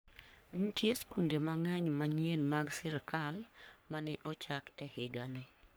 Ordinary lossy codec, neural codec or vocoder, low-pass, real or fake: none; codec, 44.1 kHz, 3.4 kbps, Pupu-Codec; none; fake